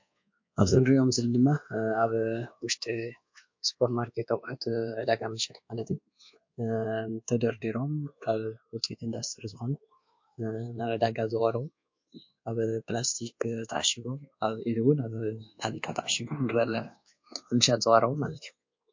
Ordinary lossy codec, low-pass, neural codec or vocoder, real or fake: MP3, 48 kbps; 7.2 kHz; codec, 24 kHz, 1.2 kbps, DualCodec; fake